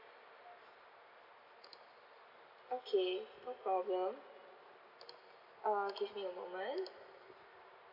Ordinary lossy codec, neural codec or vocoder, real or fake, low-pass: none; none; real; 5.4 kHz